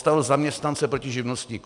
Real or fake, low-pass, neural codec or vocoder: real; 10.8 kHz; none